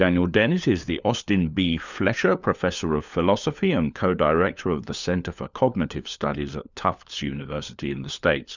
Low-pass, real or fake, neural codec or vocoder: 7.2 kHz; fake; codec, 16 kHz, 4 kbps, FunCodec, trained on LibriTTS, 50 frames a second